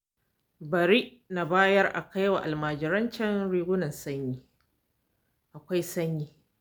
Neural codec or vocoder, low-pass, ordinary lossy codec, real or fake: none; none; none; real